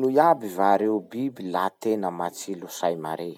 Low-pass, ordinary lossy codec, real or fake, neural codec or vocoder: 19.8 kHz; none; real; none